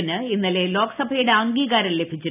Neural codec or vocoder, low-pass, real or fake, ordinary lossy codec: none; 3.6 kHz; real; none